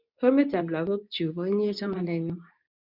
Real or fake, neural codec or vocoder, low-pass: fake; codec, 24 kHz, 0.9 kbps, WavTokenizer, medium speech release version 2; 5.4 kHz